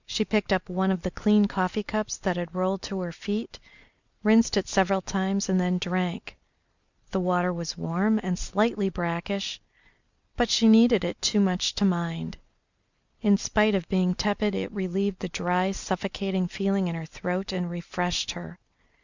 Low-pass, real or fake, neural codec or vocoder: 7.2 kHz; real; none